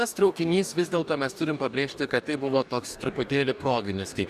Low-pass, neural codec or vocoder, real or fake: 14.4 kHz; codec, 44.1 kHz, 2.6 kbps, DAC; fake